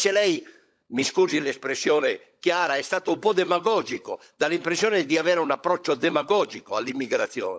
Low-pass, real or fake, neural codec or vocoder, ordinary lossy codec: none; fake; codec, 16 kHz, 16 kbps, FunCodec, trained on LibriTTS, 50 frames a second; none